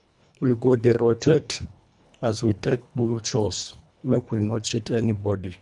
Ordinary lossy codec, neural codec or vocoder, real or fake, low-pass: none; codec, 24 kHz, 1.5 kbps, HILCodec; fake; 10.8 kHz